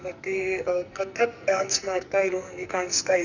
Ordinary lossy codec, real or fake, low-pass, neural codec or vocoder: none; fake; 7.2 kHz; codec, 44.1 kHz, 2.6 kbps, SNAC